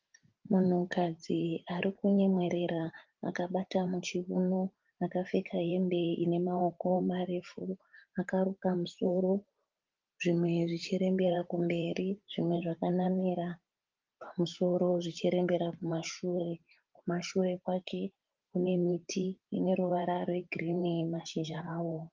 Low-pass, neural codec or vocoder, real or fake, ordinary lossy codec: 7.2 kHz; vocoder, 44.1 kHz, 128 mel bands every 512 samples, BigVGAN v2; fake; Opus, 32 kbps